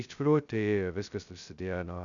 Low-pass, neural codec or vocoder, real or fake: 7.2 kHz; codec, 16 kHz, 0.2 kbps, FocalCodec; fake